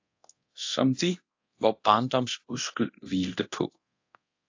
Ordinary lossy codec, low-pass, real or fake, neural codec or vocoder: AAC, 48 kbps; 7.2 kHz; fake; codec, 24 kHz, 0.9 kbps, DualCodec